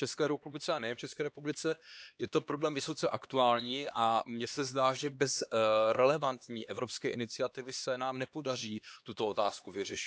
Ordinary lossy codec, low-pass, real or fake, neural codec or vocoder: none; none; fake; codec, 16 kHz, 2 kbps, X-Codec, HuBERT features, trained on LibriSpeech